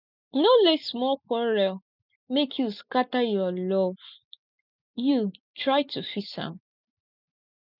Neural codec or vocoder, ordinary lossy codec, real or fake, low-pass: none; none; real; 5.4 kHz